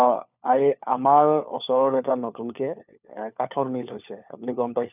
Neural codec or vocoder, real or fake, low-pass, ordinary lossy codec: codec, 16 kHz, 4 kbps, FunCodec, trained on LibriTTS, 50 frames a second; fake; 3.6 kHz; none